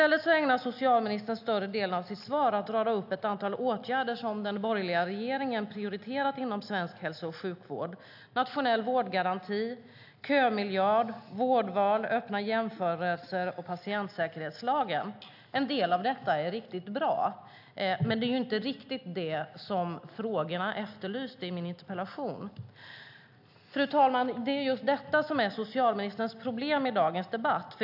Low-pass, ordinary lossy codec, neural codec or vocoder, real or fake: 5.4 kHz; AAC, 48 kbps; none; real